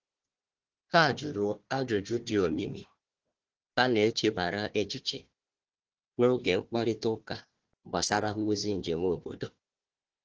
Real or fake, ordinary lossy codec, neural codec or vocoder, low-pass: fake; Opus, 16 kbps; codec, 16 kHz, 1 kbps, FunCodec, trained on Chinese and English, 50 frames a second; 7.2 kHz